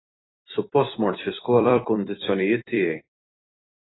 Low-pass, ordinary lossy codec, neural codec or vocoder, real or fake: 7.2 kHz; AAC, 16 kbps; vocoder, 44.1 kHz, 128 mel bands every 256 samples, BigVGAN v2; fake